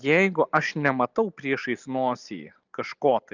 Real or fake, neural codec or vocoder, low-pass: real; none; 7.2 kHz